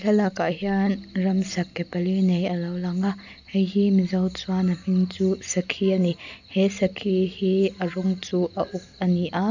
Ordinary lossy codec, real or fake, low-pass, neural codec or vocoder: none; real; 7.2 kHz; none